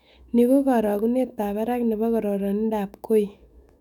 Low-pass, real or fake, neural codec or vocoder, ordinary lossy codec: 19.8 kHz; fake; autoencoder, 48 kHz, 128 numbers a frame, DAC-VAE, trained on Japanese speech; none